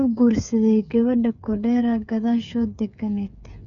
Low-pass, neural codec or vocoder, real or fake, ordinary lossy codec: 7.2 kHz; codec, 16 kHz, 8 kbps, FreqCodec, smaller model; fake; none